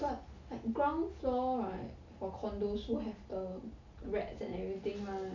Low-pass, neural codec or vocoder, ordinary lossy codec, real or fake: 7.2 kHz; none; none; real